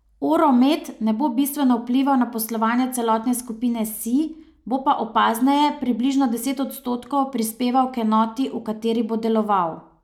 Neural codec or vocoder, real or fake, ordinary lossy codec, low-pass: none; real; none; 19.8 kHz